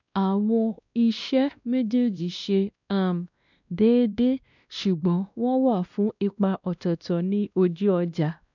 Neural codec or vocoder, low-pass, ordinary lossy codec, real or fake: codec, 16 kHz, 1 kbps, X-Codec, WavLM features, trained on Multilingual LibriSpeech; 7.2 kHz; none; fake